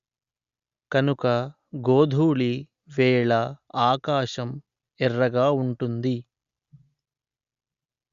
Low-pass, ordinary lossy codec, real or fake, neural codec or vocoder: 7.2 kHz; Opus, 64 kbps; real; none